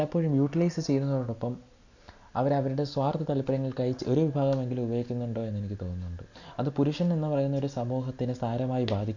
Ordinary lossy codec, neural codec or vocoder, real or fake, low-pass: none; none; real; 7.2 kHz